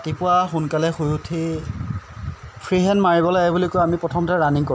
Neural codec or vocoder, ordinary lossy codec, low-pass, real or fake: none; none; none; real